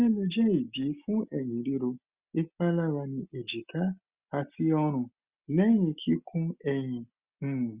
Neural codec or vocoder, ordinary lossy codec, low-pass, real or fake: none; Opus, 64 kbps; 3.6 kHz; real